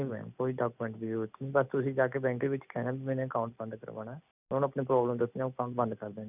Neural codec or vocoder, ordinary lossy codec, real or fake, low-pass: none; none; real; 3.6 kHz